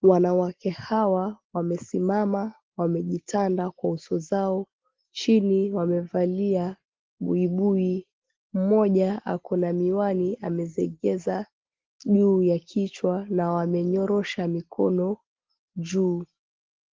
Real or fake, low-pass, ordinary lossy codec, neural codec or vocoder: real; 7.2 kHz; Opus, 16 kbps; none